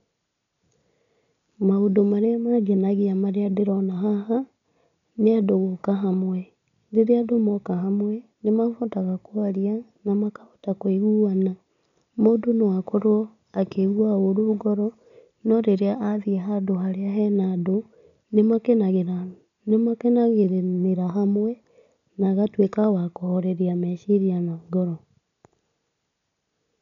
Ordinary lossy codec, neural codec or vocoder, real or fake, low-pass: none; none; real; 7.2 kHz